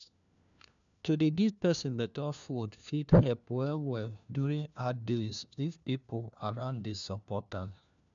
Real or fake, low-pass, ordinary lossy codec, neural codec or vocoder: fake; 7.2 kHz; none; codec, 16 kHz, 1 kbps, FunCodec, trained on LibriTTS, 50 frames a second